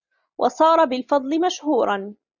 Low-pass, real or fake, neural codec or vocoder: 7.2 kHz; real; none